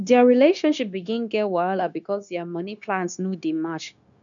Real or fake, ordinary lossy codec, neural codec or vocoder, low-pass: fake; none; codec, 16 kHz, 0.9 kbps, LongCat-Audio-Codec; 7.2 kHz